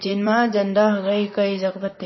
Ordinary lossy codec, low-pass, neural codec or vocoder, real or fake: MP3, 24 kbps; 7.2 kHz; vocoder, 44.1 kHz, 128 mel bands, Pupu-Vocoder; fake